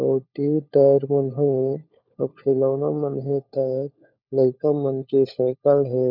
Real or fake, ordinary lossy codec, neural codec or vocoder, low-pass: fake; none; codec, 16 kHz, 4 kbps, FunCodec, trained on LibriTTS, 50 frames a second; 5.4 kHz